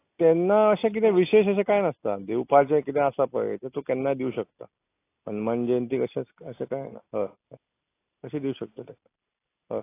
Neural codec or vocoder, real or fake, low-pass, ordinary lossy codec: none; real; 3.6 kHz; AAC, 24 kbps